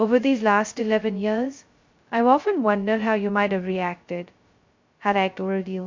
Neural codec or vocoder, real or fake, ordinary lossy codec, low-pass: codec, 16 kHz, 0.2 kbps, FocalCodec; fake; MP3, 48 kbps; 7.2 kHz